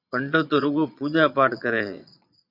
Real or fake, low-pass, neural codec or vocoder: fake; 5.4 kHz; vocoder, 22.05 kHz, 80 mel bands, Vocos